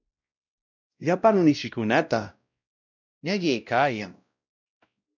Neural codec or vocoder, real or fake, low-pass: codec, 16 kHz, 0.5 kbps, X-Codec, WavLM features, trained on Multilingual LibriSpeech; fake; 7.2 kHz